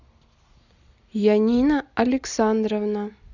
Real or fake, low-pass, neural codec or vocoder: real; 7.2 kHz; none